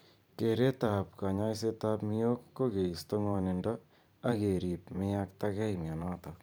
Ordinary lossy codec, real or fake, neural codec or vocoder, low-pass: none; fake; vocoder, 44.1 kHz, 128 mel bands every 512 samples, BigVGAN v2; none